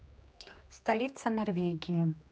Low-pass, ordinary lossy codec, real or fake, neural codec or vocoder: none; none; fake; codec, 16 kHz, 2 kbps, X-Codec, HuBERT features, trained on general audio